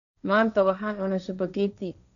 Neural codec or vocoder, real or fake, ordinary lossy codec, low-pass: codec, 16 kHz, 1.1 kbps, Voila-Tokenizer; fake; none; 7.2 kHz